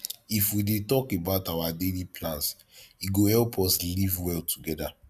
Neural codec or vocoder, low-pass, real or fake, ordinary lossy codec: none; 14.4 kHz; real; none